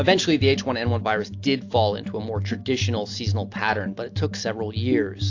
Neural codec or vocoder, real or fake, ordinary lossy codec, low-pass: none; real; AAC, 48 kbps; 7.2 kHz